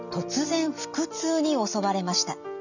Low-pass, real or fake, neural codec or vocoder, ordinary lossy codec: 7.2 kHz; real; none; none